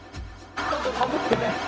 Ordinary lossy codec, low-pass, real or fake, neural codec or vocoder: none; none; fake; codec, 16 kHz, 0.4 kbps, LongCat-Audio-Codec